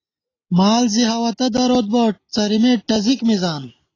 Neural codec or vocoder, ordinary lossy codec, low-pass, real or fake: none; AAC, 32 kbps; 7.2 kHz; real